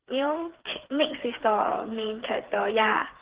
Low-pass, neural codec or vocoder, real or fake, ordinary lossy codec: 3.6 kHz; codec, 16 kHz, 4 kbps, FreqCodec, smaller model; fake; Opus, 16 kbps